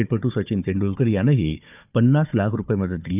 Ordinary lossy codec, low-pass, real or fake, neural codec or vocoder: none; 3.6 kHz; fake; codec, 16 kHz, 4 kbps, FunCodec, trained on Chinese and English, 50 frames a second